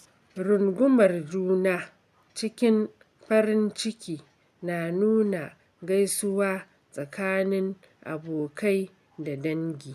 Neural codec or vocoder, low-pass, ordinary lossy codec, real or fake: none; 14.4 kHz; none; real